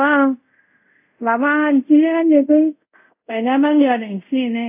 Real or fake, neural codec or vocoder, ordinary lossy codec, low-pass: fake; codec, 24 kHz, 0.5 kbps, DualCodec; AAC, 32 kbps; 3.6 kHz